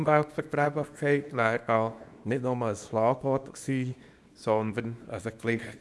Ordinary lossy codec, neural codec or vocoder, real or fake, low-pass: none; codec, 24 kHz, 0.9 kbps, WavTokenizer, small release; fake; none